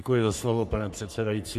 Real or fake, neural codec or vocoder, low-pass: fake; codec, 44.1 kHz, 3.4 kbps, Pupu-Codec; 14.4 kHz